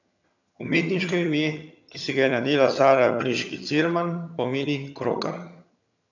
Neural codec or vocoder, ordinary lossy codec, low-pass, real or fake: vocoder, 22.05 kHz, 80 mel bands, HiFi-GAN; none; 7.2 kHz; fake